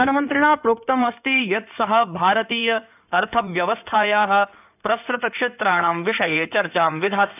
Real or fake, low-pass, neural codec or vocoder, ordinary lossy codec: fake; 3.6 kHz; codec, 16 kHz in and 24 kHz out, 2.2 kbps, FireRedTTS-2 codec; none